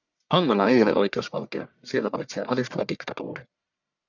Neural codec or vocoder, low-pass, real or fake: codec, 44.1 kHz, 1.7 kbps, Pupu-Codec; 7.2 kHz; fake